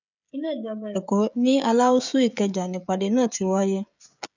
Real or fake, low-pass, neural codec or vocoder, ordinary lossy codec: fake; 7.2 kHz; codec, 16 kHz, 16 kbps, FreqCodec, smaller model; none